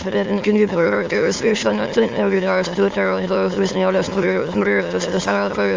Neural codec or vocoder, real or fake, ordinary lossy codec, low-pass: autoencoder, 22.05 kHz, a latent of 192 numbers a frame, VITS, trained on many speakers; fake; Opus, 32 kbps; 7.2 kHz